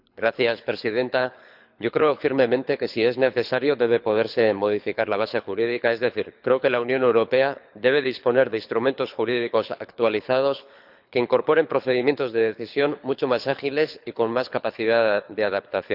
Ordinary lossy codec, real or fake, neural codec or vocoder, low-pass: none; fake; codec, 24 kHz, 6 kbps, HILCodec; 5.4 kHz